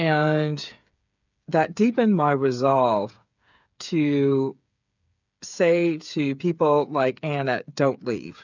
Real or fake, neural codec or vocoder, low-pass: fake; codec, 16 kHz, 8 kbps, FreqCodec, smaller model; 7.2 kHz